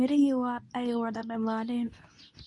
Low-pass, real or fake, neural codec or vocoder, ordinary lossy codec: 10.8 kHz; fake; codec, 24 kHz, 0.9 kbps, WavTokenizer, medium speech release version 2; MP3, 48 kbps